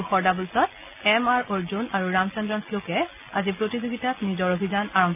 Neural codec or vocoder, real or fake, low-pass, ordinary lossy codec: none; real; 3.6 kHz; none